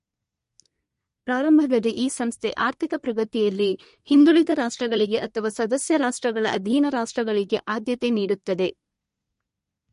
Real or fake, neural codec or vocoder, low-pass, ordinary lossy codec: fake; codec, 24 kHz, 1 kbps, SNAC; 10.8 kHz; MP3, 48 kbps